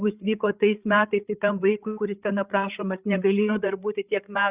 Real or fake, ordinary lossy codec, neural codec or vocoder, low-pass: fake; Opus, 64 kbps; codec, 16 kHz, 8 kbps, FreqCodec, larger model; 3.6 kHz